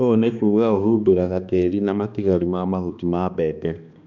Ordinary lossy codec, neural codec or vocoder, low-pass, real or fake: none; codec, 16 kHz, 2 kbps, X-Codec, HuBERT features, trained on balanced general audio; 7.2 kHz; fake